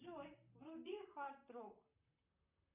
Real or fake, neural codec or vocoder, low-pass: fake; vocoder, 44.1 kHz, 128 mel bands, Pupu-Vocoder; 3.6 kHz